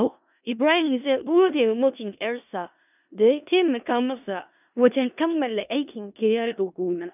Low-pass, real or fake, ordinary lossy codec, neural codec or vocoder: 3.6 kHz; fake; none; codec, 16 kHz in and 24 kHz out, 0.4 kbps, LongCat-Audio-Codec, four codebook decoder